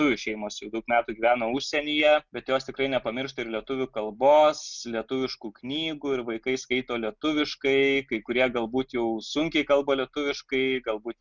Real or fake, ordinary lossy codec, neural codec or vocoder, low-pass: real; Opus, 64 kbps; none; 7.2 kHz